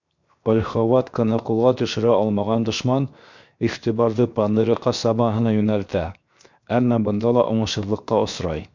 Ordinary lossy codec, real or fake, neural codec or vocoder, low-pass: MP3, 64 kbps; fake; codec, 16 kHz, 0.7 kbps, FocalCodec; 7.2 kHz